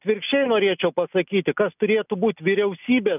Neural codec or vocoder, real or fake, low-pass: none; real; 3.6 kHz